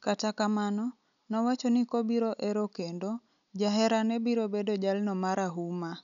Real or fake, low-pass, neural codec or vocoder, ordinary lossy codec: real; 7.2 kHz; none; none